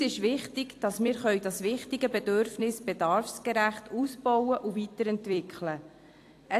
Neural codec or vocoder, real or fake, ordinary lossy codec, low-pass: vocoder, 44.1 kHz, 128 mel bands every 256 samples, BigVGAN v2; fake; AAC, 64 kbps; 14.4 kHz